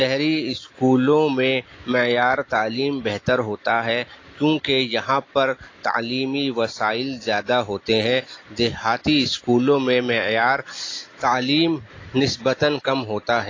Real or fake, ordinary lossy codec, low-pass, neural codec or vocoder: real; AAC, 32 kbps; 7.2 kHz; none